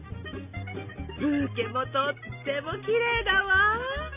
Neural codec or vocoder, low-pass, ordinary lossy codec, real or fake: none; 3.6 kHz; none; real